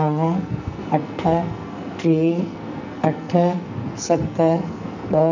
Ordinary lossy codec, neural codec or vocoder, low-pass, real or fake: none; codec, 44.1 kHz, 2.6 kbps, SNAC; 7.2 kHz; fake